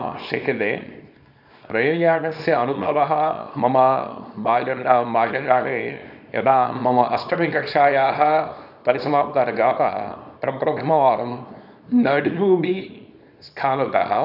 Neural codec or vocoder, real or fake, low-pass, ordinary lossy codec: codec, 24 kHz, 0.9 kbps, WavTokenizer, small release; fake; 5.4 kHz; none